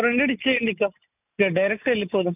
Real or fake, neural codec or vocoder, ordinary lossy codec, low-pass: real; none; none; 3.6 kHz